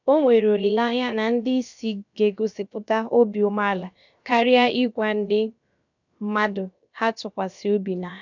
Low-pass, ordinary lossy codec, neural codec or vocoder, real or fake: 7.2 kHz; none; codec, 16 kHz, about 1 kbps, DyCAST, with the encoder's durations; fake